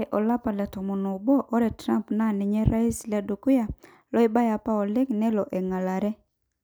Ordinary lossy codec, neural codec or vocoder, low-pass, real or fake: none; none; none; real